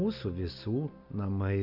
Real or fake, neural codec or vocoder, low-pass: real; none; 5.4 kHz